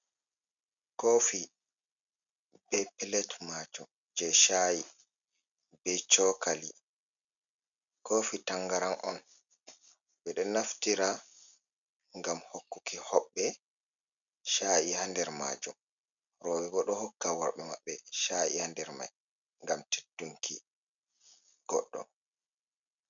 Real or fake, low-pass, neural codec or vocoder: real; 7.2 kHz; none